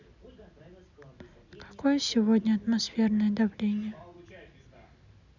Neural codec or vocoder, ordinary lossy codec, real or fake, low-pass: none; none; real; 7.2 kHz